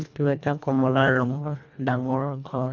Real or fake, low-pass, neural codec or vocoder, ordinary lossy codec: fake; 7.2 kHz; codec, 24 kHz, 1.5 kbps, HILCodec; none